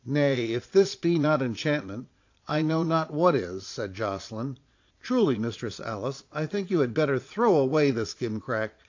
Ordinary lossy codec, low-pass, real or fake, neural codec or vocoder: AAC, 48 kbps; 7.2 kHz; fake; vocoder, 22.05 kHz, 80 mel bands, Vocos